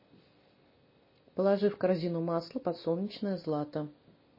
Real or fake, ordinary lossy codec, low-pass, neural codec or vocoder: real; MP3, 24 kbps; 5.4 kHz; none